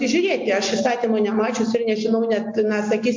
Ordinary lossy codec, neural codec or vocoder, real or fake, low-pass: MP3, 48 kbps; none; real; 7.2 kHz